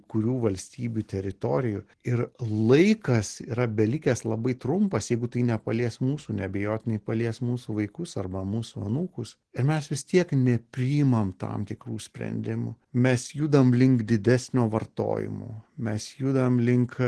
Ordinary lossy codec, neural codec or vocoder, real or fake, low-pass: Opus, 16 kbps; none; real; 10.8 kHz